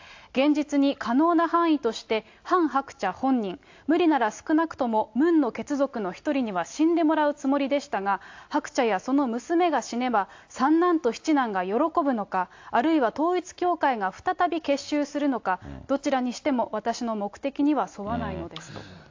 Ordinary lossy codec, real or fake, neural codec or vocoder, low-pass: AAC, 48 kbps; real; none; 7.2 kHz